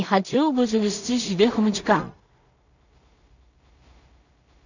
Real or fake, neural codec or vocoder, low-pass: fake; codec, 16 kHz in and 24 kHz out, 0.4 kbps, LongCat-Audio-Codec, two codebook decoder; 7.2 kHz